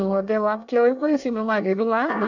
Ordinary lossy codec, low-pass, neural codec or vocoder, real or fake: none; 7.2 kHz; codec, 24 kHz, 1 kbps, SNAC; fake